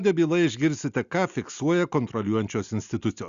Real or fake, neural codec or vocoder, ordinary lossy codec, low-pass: real; none; Opus, 64 kbps; 7.2 kHz